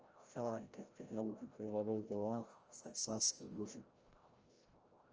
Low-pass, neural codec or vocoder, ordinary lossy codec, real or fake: 7.2 kHz; codec, 16 kHz, 0.5 kbps, FreqCodec, larger model; Opus, 24 kbps; fake